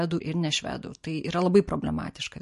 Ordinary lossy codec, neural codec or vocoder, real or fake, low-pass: MP3, 48 kbps; none; real; 14.4 kHz